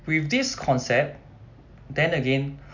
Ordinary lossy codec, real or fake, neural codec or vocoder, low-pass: none; real; none; 7.2 kHz